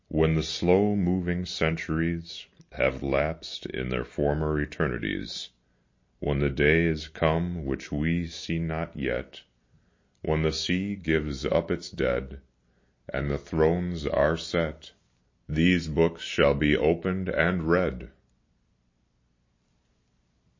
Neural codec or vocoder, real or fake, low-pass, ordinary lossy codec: none; real; 7.2 kHz; MP3, 32 kbps